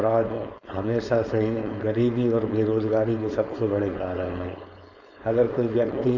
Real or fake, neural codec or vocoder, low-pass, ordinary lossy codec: fake; codec, 16 kHz, 4.8 kbps, FACodec; 7.2 kHz; none